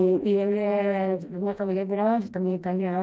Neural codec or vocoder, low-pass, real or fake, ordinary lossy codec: codec, 16 kHz, 1 kbps, FreqCodec, smaller model; none; fake; none